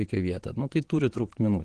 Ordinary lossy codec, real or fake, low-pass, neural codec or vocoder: Opus, 16 kbps; fake; 9.9 kHz; vocoder, 22.05 kHz, 80 mel bands, Vocos